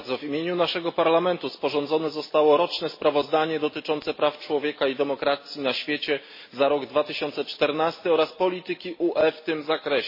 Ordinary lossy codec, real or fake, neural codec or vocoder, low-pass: MP3, 24 kbps; real; none; 5.4 kHz